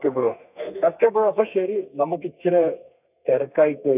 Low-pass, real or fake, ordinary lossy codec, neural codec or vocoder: 3.6 kHz; fake; none; codec, 32 kHz, 1.9 kbps, SNAC